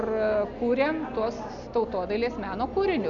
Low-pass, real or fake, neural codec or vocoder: 7.2 kHz; real; none